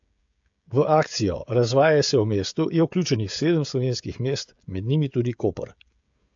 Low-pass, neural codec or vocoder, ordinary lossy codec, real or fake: 7.2 kHz; codec, 16 kHz, 16 kbps, FreqCodec, smaller model; AAC, 64 kbps; fake